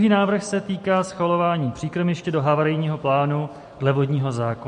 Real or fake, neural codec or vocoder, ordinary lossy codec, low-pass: real; none; MP3, 48 kbps; 14.4 kHz